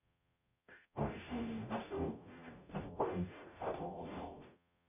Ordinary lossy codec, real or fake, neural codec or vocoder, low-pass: none; fake; codec, 44.1 kHz, 0.9 kbps, DAC; 3.6 kHz